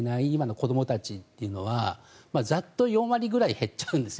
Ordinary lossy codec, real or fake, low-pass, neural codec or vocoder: none; real; none; none